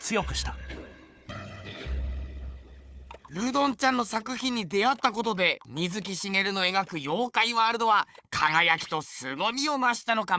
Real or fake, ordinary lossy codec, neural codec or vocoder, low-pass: fake; none; codec, 16 kHz, 4 kbps, FunCodec, trained on Chinese and English, 50 frames a second; none